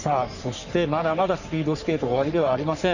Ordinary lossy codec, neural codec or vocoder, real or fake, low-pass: none; codec, 44.1 kHz, 3.4 kbps, Pupu-Codec; fake; 7.2 kHz